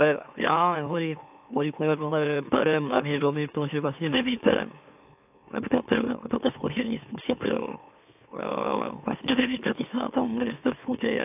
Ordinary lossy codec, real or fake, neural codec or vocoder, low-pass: AAC, 32 kbps; fake; autoencoder, 44.1 kHz, a latent of 192 numbers a frame, MeloTTS; 3.6 kHz